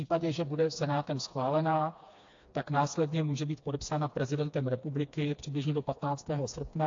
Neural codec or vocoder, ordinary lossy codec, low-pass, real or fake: codec, 16 kHz, 2 kbps, FreqCodec, smaller model; AAC, 48 kbps; 7.2 kHz; fake